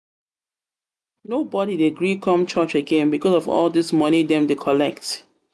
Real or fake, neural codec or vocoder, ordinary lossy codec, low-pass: real; none; none; none